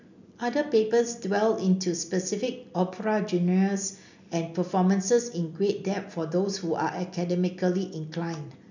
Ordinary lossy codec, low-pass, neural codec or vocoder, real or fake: none; 7.2 kHz; none; real